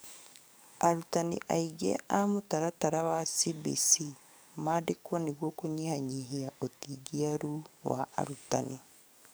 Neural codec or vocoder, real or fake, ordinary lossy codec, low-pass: codec, 44.1 kHz, 7.8 kbps, DAC; fake; none; none